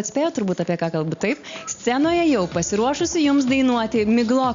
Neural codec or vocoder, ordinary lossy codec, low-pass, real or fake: none; Opus, 64 kbps; 7.2 kHz; real